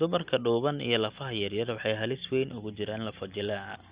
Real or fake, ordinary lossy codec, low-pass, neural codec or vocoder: real; Opus, 64 kbps; 3.6 kHz; none